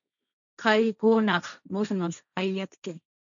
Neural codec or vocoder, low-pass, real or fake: codec, 16 kHz, 1.1 kbps, Voila-Tokenizer; 7.2 kHz; fake